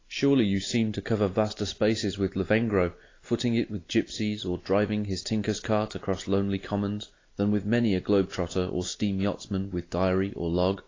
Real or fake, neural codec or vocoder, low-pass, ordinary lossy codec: real; none; 7.2 kHz; AAC, 32 kbps